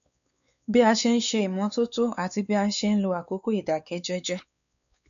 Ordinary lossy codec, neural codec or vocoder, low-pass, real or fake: none; codec, 16 kHz, 4 kbps, X-Codec, WavLM features, trained on Multilingual LibriSpeech; 7.2 kHz; fake